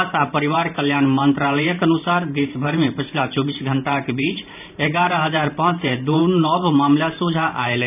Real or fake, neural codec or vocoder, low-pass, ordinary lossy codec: fake; vocoder, 44.1 kHz, 128 mel bands every 512 samples, BigVGAN v2; 3.6 kHz; none